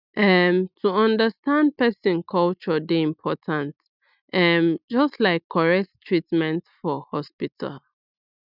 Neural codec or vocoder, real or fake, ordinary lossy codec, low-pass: none; real; none; 5.4 kHz